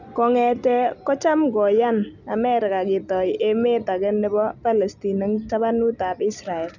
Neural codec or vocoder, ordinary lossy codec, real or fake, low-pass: none; none; real; 7.2 kHz